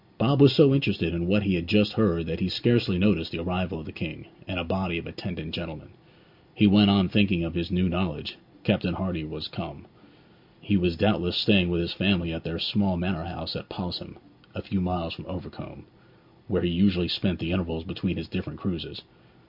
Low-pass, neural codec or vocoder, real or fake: 5.4 kHz; none; real